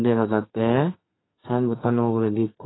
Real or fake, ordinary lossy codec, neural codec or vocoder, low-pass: fake; AAC, 16 kbps; codec, 32 kHz, 1.9 kbps, SNAC; 7.2 kHz